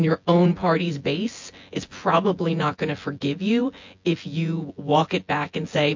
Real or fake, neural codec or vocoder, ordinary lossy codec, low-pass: fake; vocoder, 24 kHz, 100 mel bands, Vocos; MP3, 48 kbps; 7.2 kHz